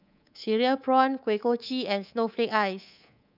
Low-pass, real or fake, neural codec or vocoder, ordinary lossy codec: 5.4 kHz; fake; codec, 24 kHz, 3.1 kbps, DualCodec; none